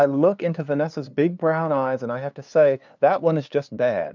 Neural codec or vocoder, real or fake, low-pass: codec, 16 kHz, 2 kbps, FunCodec, trained on LibriTTS, 25 frames a second; fake; 7.2 kHz